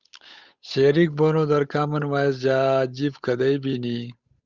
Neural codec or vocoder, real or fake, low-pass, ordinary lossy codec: codec, 16 kHz, 8 kbps, FunCodec, trained on Chinese and English, 25 frames a second; fake; 7.2 kHz; Opus, 64 kbps